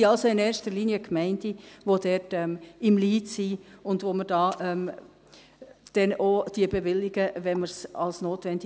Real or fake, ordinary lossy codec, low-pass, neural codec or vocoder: real; none; none; none